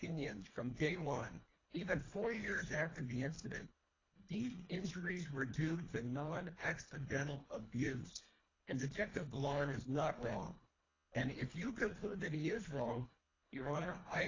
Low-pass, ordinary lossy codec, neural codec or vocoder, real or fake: 7.2 kHz; AAC, 32 kbps; codec, 24 kHz, 1.5 kbps, HILCodec; fake